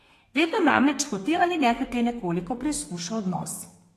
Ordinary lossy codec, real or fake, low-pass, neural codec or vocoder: AAC, 48 kbps; fake; 14.4 kHz; codec, 32 kHz, 1.9 kbps, SNAC